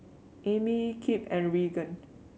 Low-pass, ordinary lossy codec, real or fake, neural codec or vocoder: none; none; real; none